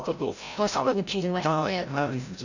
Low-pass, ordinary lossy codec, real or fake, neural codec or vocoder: 7.2 kHz; none; fake; codec, 16 kHz, 0.5 kbps, FreqCodec, larger model